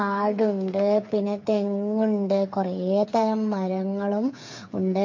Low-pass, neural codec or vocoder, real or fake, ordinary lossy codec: 7.2 kHz; codec, 16 kHz, 8 kbps, FreqCodec, smaller model; fake; MP3, 48 kbps